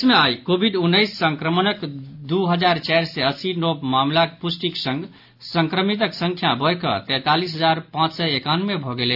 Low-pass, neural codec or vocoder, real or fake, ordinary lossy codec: 5.4 kHz; none; real; AAC, 48 kbps